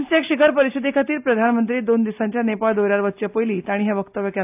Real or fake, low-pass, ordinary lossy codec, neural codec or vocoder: real; 3.6 kHz; AAC, 32 kbps; none